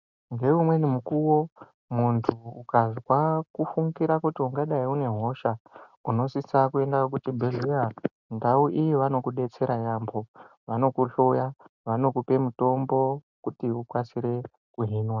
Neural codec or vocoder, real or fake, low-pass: none; real; 7.2 kHz